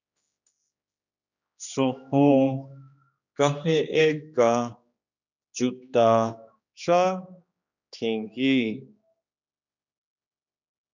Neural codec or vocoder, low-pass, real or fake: codec, 16 kHz, 2 kbps, X-Codec, HuBERT features, trained on general audio; 7.2 kHz; fake